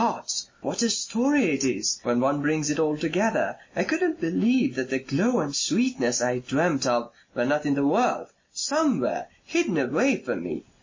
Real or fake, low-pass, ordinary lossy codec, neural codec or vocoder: real; 7.2 kHz; MP3, 32 kbps; none